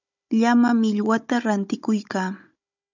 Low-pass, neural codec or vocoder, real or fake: 7.2 kHz; codec, 16 kHz, 16 kbps, FunCodec, trained on Chinese and English, 50 frames a second; fake